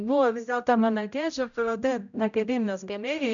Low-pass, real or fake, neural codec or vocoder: 7.2 kHz; fake; codec, 16 kHz, 0.5 kbps, X-Codec, HuBERT features, trained on general audio